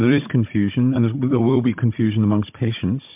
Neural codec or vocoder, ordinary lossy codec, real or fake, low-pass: codec, 16 kHz, 16 kbps, FunCodec, trained on Chinese and English, 50 frames a second; MP3, 32 kbps; fake; 3.6 kHz